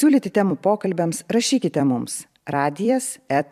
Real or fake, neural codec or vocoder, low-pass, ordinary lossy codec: fake; vocoder, 44.1 kHz, 128 mel bands every 256 samples, BigVGAN v2; 14.4 kHz; AAC, 96 kbps